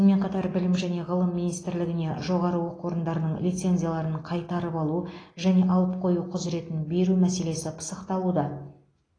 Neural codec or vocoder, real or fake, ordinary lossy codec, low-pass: none; real; AAC, 32 kbps; 9.9 kHz